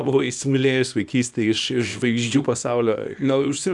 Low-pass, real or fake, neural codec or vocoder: 10.8 kHz; fake; codec, 24 kHz, 0.9 kbps, WavTokenizer, small release